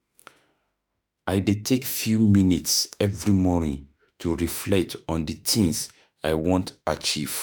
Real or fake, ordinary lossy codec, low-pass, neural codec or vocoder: fake; none; none; autoencoder, 48 kHz, 32 numbers a frame, DAC-VAE, trained on Japanese speech